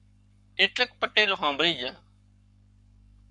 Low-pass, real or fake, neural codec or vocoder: 10.8 kHz; fake; codec, 44.1 kHz, 7.8 kbps, Pupu-Codec